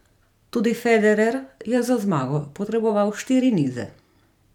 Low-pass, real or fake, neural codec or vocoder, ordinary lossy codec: 19.8 kHz; fake; vocoder, 44.1 kHz, 128 mel bands every 512 samples, BigVGAN v2; none